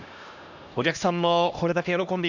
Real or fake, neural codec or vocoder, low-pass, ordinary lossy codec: fake; codec, 16 kHz, 1 kbps, X-Codec, HuBERT features, trained on LibriSpeech; 7.2 kHz; none